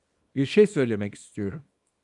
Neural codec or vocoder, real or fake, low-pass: codec, 24 kHz, 0.9 kbps, WavTokenizer, small release; fake; 10.8 kHz